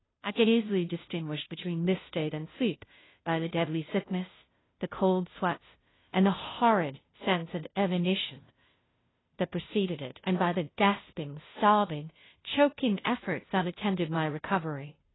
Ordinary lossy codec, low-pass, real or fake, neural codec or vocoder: AAC, 16 kbps; 7.2 kHz; fake; codec, 16 kHz, 0.5 kbps, FunCodec, trained on Chinese and English, 25 frames a second